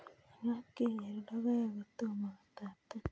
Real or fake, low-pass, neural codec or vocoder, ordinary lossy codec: real; none; none; none